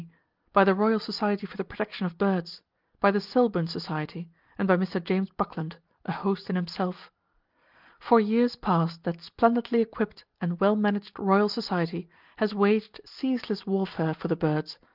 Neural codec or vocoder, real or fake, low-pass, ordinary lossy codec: none; real; 5.4 kHz; Opus, 24 kbps